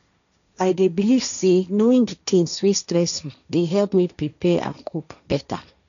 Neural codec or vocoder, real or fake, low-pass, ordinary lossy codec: codec, 16 kHz, 1.1 kbps, Voila-Tokenizer; fake; 7.2 kHz; none